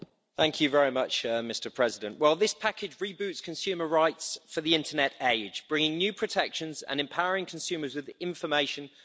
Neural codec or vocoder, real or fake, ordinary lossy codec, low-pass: none; real; none; none